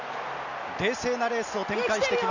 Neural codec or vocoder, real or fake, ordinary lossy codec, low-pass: none; real; none; 7.2 kHz